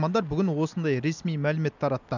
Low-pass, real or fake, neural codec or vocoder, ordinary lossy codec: 7.2 kHz; real; none; none